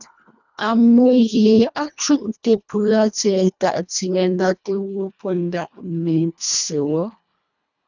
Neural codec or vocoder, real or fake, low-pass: codec, 24 kHz, 1.5 kbps, HILCodec; fake; 7.2 kHz